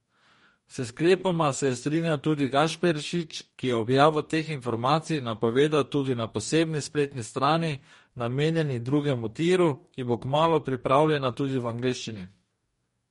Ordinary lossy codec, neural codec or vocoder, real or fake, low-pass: MP3, 48 kbps; codec, 44.1 kHz, 2.6 kbps, DAC; fake; 19.8 kHz